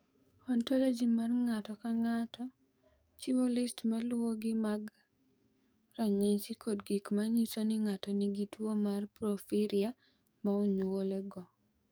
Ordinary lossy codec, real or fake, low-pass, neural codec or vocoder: none; fake; none; codec, 44.1 kHz, 7.8 kbps, DAC